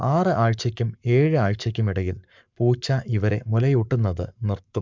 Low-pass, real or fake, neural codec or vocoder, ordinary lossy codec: 7.2 kHz; fake; codec, 44.1 kHz, 7.8 kbps, Pupu-Codec; MP3, 64 kbps